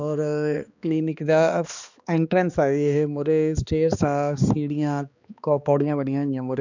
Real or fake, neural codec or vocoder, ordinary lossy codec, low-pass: fake; codec, 16 kHz, 2 kbps, X-Codec, HuBERT features, trained on balanced general audio; none; 7.2 kHz